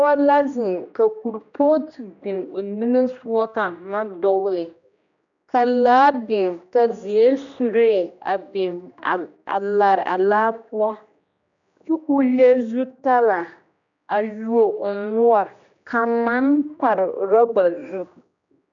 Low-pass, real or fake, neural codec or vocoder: 7.2 kHz; fake; codec, 16 kHz, 1 kbps, X-Codec, HuBERT features, trained on general audio